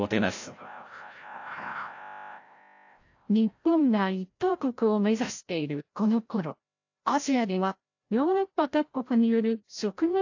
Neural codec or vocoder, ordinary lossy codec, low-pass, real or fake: codec, 16 kHz, 0.5 kbps, FreqCodec, larger model; AAC, 48 kbps; 7.2 kHz; fake